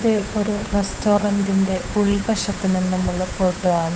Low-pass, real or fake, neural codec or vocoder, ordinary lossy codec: none; fake; codec, 16 kHz, 8 kbps, FunCodec, trained on Chinese and English, 25 frames a second; none